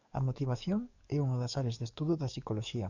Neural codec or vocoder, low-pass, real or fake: codec, 44.1 kHz, 7.8 kbps, DAC; 7.2 kHz; fake